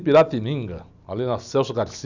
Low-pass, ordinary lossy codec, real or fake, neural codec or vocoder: 7.2 kHz; none; real; none